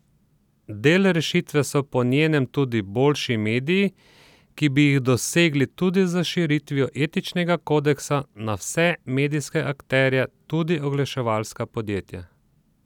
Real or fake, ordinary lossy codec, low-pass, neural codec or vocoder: real; none; 19.8 kHz; none